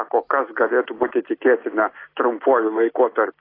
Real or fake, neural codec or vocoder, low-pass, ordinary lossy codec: real; none; 5.4 kHz; AAC, 24 kbps